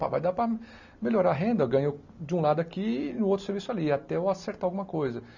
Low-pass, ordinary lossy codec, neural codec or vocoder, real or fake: 7.2 kHz; none; none; real